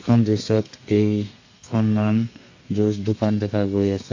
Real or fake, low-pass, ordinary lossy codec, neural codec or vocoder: fake; 7.2 kHz; AAC, 48 kbps; codec, 32 kHz, 1.9 kbps, SNAC